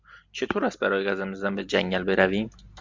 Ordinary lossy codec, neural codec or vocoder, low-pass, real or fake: MP3, 64 kbps; none; 7.2 kHz; real